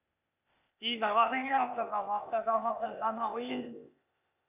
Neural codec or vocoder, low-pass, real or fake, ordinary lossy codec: codec, 16 kHz, 0.8 kbps, ZipCodec; 3.6 kHz; fake; none